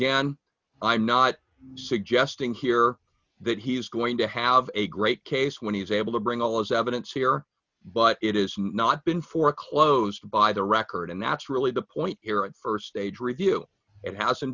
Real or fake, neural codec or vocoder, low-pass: real; none; 7.2 kHz